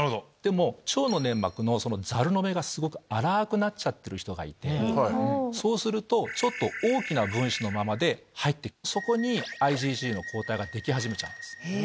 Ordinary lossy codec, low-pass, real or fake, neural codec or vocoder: none; none; real; none